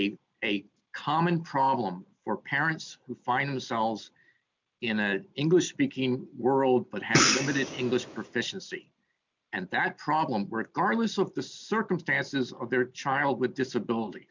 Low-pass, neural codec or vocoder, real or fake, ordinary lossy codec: 7.2 kHz; none; real; MP3, 64 kbps